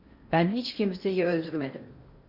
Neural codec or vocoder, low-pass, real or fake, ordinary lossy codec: codec, 16 kHz in and 24 kHz out, 0.8 kbps, FocalCodec, streaming, 65536 codes; 5.4 kHz; fake; Opus, 64 kbps